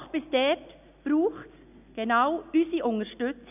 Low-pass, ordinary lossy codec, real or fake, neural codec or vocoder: 3.6 kHz; none; real; none